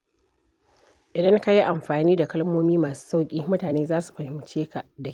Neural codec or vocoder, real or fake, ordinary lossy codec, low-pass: vocoder, 44.1 kHz, 128 mel bands every 256 samples, BigVGAN v2; fake; Opus, 32 kbps; 19.8 kHz